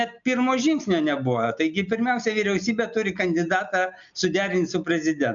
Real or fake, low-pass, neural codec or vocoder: real; 7.2 kHz; none